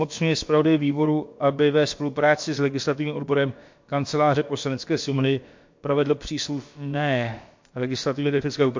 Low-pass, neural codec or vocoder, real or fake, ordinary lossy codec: 7.2 kHz; codec, 16 kHz, about 1 kbps, DyCAST, with the encoder's durations; fake; MP3, 48 kbps